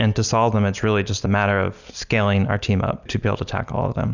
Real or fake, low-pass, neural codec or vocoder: real; 7.2 kHz; none